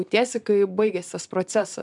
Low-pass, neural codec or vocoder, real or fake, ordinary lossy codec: 10.8 kHz; vocoder, 44.1 kHz, 128 mel bands every 256 samples, BigVGAN v2; fake; MP3, 96 kbps